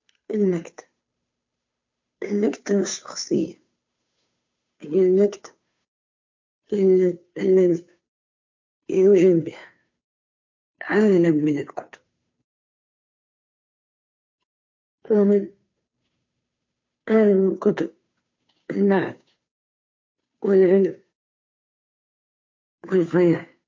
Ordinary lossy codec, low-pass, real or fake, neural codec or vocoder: MP3, 48 kbps; 7.2 kHz; fake; codec, 16 kHz, 2 kbps, FunCodec, trained on Chinese and English, 25 frames a second